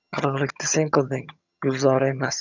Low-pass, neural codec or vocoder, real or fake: 7.2 kHz; vocoder, 22.05 kHz, 80 mel bands, HiFi-GAN; fake